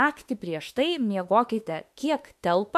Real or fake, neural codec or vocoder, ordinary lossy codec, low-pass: fake; autoencoder, 48 kHz, 32 numbers a frame, DAC-VAE, trained on Japanese speech; AAC, 96 kbps; 14.4 kHz